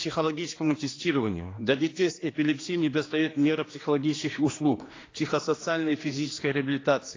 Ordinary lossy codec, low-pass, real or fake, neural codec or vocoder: AAC, 32 kbps; 7.2 kHz; fake; codec, 16 kHz, 2 kbps, X-Codec, HuBERT features, trained on general audio